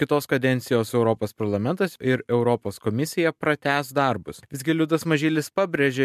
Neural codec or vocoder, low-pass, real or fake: none; 14.4 kHz; real